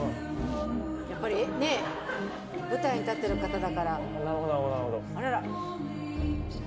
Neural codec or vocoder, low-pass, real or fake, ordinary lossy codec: none; none; real; none